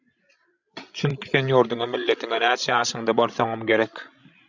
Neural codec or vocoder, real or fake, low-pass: codec, 16 kHz, 16 kbps, FreqCodec, larger model; fake; 7.2 kHz